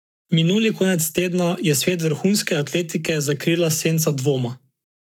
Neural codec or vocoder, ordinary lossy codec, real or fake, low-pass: codec, 44.1 kHz, 7.8 kbps, Pupu-Codec; none; fake; 19.8 kHz